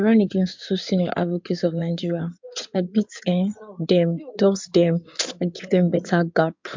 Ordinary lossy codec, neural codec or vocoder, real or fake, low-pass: MP3, 64 kbps; codec, 16 kHz in and 24 kHz out, 2.2 kbps, FireRedTTS-2 codec; fake; 7.2 kHz